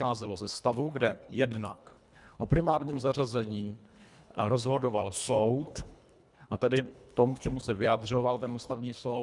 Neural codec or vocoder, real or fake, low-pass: codec, 24 kHz, 1.5 kbps, HILCodec; fake; 10.8 kHz